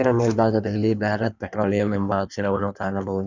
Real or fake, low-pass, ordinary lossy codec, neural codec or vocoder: fake; 7.2 kHz; none; codec, 16 kHz in and 24 kHz out, 1.1 kbps, FireRedTTS-2 codec